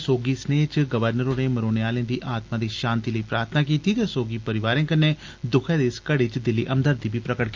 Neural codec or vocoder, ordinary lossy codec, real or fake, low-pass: none; Opus, 24 kbps; real; 7.2 kHz